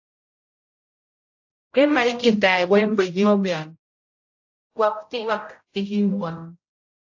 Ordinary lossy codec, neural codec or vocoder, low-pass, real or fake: AAC, 48 kbps; codec, 16 kHz, 0.5 kbps, X-Codec, HuBERT features, trained on general audio; 7.2 kHz; fake